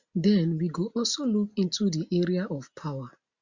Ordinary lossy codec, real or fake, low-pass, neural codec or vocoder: Opus, 64 kbps; real; 7.2 kHz; none